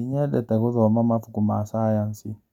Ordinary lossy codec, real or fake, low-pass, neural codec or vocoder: none; real; 19.8 kHz; none